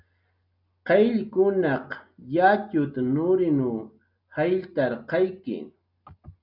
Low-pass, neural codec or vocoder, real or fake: 5.4 kHz; none; real